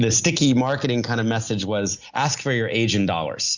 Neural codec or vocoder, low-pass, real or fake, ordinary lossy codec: none; 7.2 kHz; real; Opus, 64 kbps